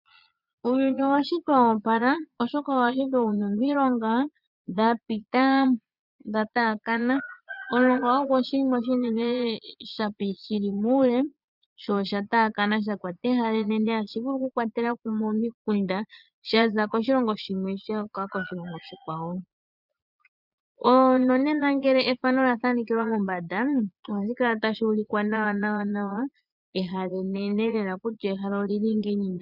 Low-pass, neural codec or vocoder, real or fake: 5.4 kHz; vocoder, 22.05 kHz, 80 mel bands, Vocos; fake